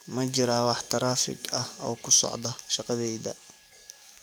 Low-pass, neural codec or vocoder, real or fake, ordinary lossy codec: none; codec, 44.1 kHz, 7.8 kbps, DAC; fake; none